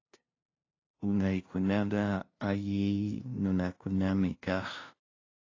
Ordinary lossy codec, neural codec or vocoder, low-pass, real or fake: AAC, 32 kbps; codec, 16 kHz, 0.5 kbps, FunCodec, trained on LibriTTS, 25 frames a second; 7.2 kHz; fake